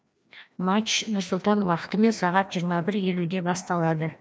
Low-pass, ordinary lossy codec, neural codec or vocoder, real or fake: none; none; codec, 16 kHz, 1 kbps, FreqCodec, larger model; fake